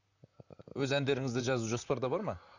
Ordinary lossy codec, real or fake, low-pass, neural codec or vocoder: none; fake; 7.2 kHz; vocoder, 44.1 kHz, 128 mel bands, Pupu-Vocoder